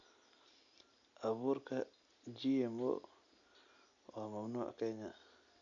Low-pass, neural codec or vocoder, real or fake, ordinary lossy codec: 7.2 kHz; none; real; none